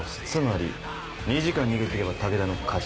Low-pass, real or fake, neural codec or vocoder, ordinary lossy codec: none; real; none; none